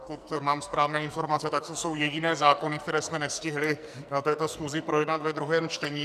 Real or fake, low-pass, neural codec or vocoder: fake; 14.4 kHz; codec, 44.1 kHz, 2.6 kbps, SNAC